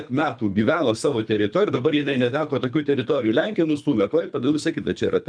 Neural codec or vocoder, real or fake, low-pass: codec, 24 kHz, 3 kbps, HILCodec; fake; 9.9 kHz